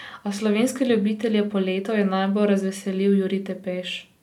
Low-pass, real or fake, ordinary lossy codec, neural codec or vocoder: 19.8 kHz; real; none; none